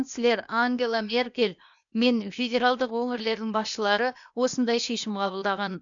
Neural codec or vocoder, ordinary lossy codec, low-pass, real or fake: codec, 16 kHz, 0.8 kbps, ZipCodec; none; 7.2 kHz; fake